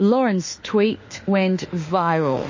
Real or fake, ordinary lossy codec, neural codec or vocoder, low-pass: fake; MP3, 32 kbps; autoencoder, 48 kHz, 32 numbers a frame, DAC-VAE, trained on Japanese speech; 7.2 kHz